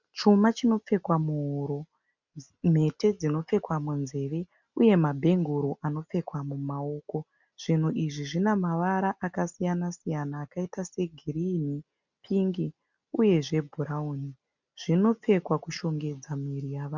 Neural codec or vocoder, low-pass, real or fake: none; 7.2 kHz; real